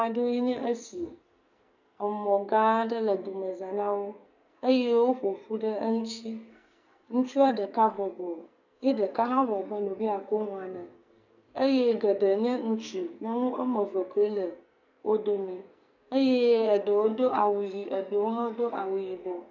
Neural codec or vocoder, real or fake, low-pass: codec, 44.1 kHz, 2.6 kbps, SNAC; fake; 7.2 kHz